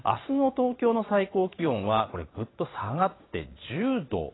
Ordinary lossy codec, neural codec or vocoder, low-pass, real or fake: AAC, 16 kbps; vocoder, 22.05 kHz, 80 mel bands, Vocos; 7.2 kHz; fake